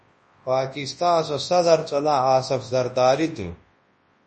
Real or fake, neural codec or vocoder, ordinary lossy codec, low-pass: fake; codec, 24 kHz, 0.9 kbps, WavTokenizer, large speech release; MP3, 32 kbps; 10.8 kHz